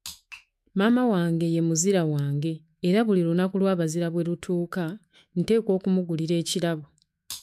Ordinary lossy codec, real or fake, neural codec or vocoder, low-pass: MP3, 96 kbps; fake; autoencoder, 48 kHz, 128 numbers a frame, DAC-VAE, trained on Japanese speech; 14.4 kHz